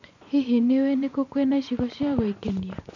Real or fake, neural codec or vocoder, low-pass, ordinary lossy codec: fake; vocoder, 24 kHz, 100 mel bands, Vocos; 7.2 kHz; none